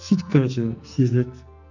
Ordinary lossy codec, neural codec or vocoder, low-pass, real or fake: none; codec, 44.1 kHz, 2.6 kbps, SNAC; 7.2 kHz; fake